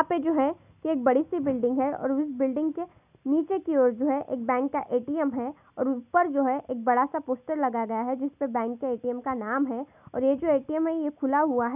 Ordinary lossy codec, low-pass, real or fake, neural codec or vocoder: none; 3.6 kHz; real; none